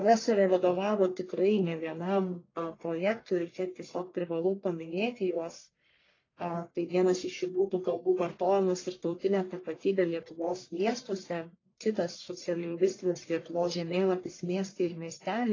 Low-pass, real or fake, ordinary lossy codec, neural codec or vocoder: 7.2 kHz; fake; AAC, 32 kbps; codec, 44.1 kHz, 1.7 kbps, Pupu-Codec